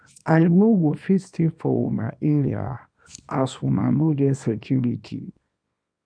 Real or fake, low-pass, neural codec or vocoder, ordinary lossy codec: fake; 9.9 kHz; codec, 24 kHz, 0.9 kbps, WavTokenizer, small release; none